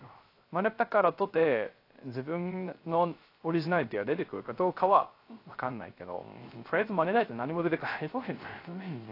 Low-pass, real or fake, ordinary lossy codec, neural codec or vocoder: 5.4 kHz; fake; AAC, 32 kbps; codec, 16 kHz, 0.3 kbps, FocalCodec